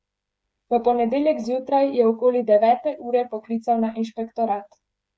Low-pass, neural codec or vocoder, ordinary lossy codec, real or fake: none; codec, 16 kHz, 8 kbps, FreqCodec, smaller model; none; fake